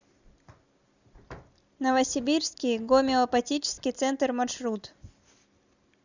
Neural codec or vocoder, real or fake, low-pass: none; real; 7.2 kHz